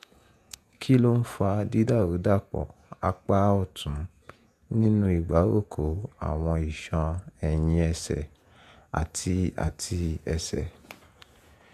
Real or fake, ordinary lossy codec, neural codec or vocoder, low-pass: fake; none; vocoder, 48 kHz, 128 mel bands, Vocos; 14.4 kHz